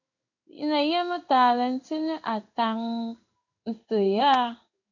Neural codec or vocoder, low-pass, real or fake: codec, 16 kHz in and 24 kHz out, 1 kbps, XY-Tokenizer; 7.2 kHz; fake